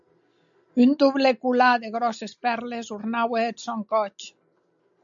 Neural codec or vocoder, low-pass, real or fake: none; 7.2 kHz; real